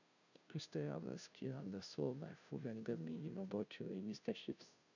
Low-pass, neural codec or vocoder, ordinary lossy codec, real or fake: 7.2 kHz; codec, 16 kHz, 0.5 kbps, FunCodec, trained on Chinese and English, 25 frames a second; none; fake